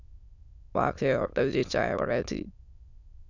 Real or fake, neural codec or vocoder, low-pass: fake; autoencoder, 22.05 kHz, a latent of 192 numbers a frame, VITS, trained on many speakers; 7.2 kHz